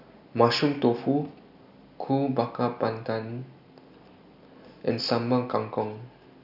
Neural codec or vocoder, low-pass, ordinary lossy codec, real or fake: none; 5.4 kHz; none; real